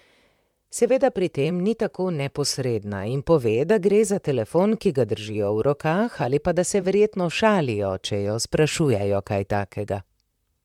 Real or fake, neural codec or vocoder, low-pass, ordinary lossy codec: fake; vocoder, 44.1 kHz, 128 mel bands, Pupu-Vocoder; 19.8 kHz; MP3, 96 kbps